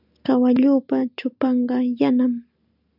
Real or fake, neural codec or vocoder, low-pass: real; none; 5.4 kHz